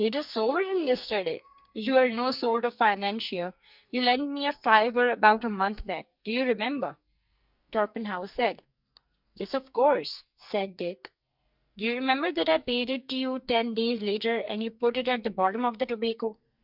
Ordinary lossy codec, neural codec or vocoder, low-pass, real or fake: Opus, 64 kbps; codec, 44.1 kHz, 2.6 kbps, SNAC; 5.4 kHz; fake